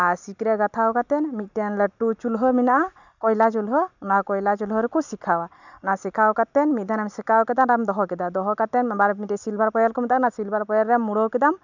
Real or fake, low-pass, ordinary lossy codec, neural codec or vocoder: real; 7.2 kHz; none; none